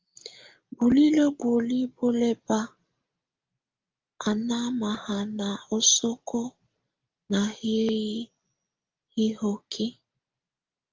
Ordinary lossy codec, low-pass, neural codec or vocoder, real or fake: Opus, 24 kbps; 7.2 kHz; none; real